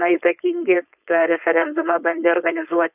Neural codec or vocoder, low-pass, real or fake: codec, 16 kHz, 4.8 kbps, FACodec; 3.6 kHz; fake